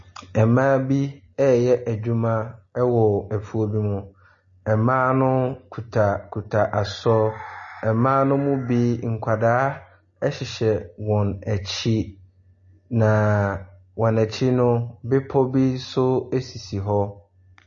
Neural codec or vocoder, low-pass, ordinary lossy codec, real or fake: none; 7.2 kHz; MP3, 32 kbps; real